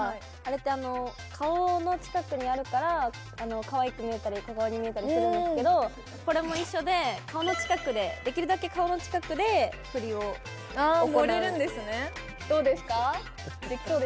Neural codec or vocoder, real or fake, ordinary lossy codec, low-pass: none; real; none; none